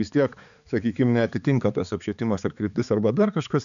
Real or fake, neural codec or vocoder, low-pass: fake; codec, 16 kHz, 4 kbps, X-Codec, HuBERT features, trained on balanced general audio; 7.2 kHz